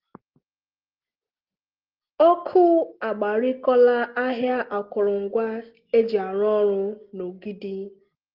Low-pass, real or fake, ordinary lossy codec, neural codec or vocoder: 5.4 kHz; real; Opus, 16 kbps; none